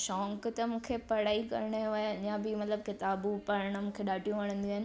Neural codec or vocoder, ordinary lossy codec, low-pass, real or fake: none; none; none; real